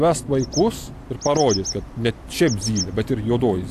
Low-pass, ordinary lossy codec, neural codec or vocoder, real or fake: 14.4 kHz; AAC, 64 kbps; none; real